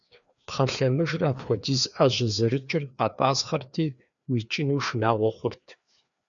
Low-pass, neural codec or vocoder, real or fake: 7.2 kHz; codec, 16 kHz, 2 kbps, FreqCodec, larger model; fake